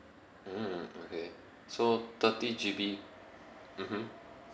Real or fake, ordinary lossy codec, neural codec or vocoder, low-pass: real; none; none; none